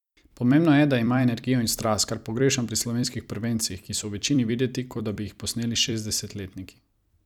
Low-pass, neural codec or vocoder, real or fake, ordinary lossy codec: 19.8 kHz; vocoder, 44.1 kHz, 128 mel bands every 256 samples, BigVGAN v2; fake; none